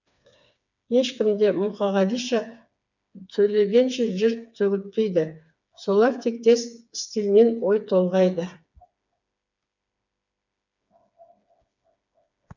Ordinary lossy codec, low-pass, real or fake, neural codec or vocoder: none; 7.2 kHz; fake; codec, 16 kHz, 4 kbps, FreqCodec, smaller model